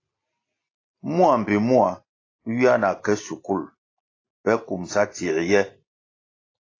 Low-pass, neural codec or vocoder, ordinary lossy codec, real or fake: 7.2 kHz; none; AAC, 32 kbps; real